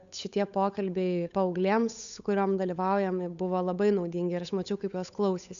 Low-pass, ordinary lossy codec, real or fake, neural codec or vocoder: 7.2 kHz; AAC, 96 kbps; fake; codec, 16 kHz, 8 kbps, FunCodec, trained on Chinese and English, 25 frames a second